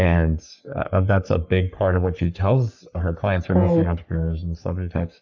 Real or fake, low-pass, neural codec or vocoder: fake; 7.2 kHz; codec, 44.1 kHz, 3.4 kbps, Pupu-Codec